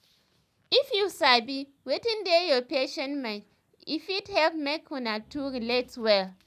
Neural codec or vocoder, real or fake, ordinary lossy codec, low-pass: none; real; none; 14.4 kHz